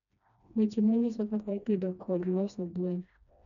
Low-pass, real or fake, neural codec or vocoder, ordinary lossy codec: 7.2 kHz; fake; codec, 16 kHz, 1 kbps, FreqCodec, smaller model; none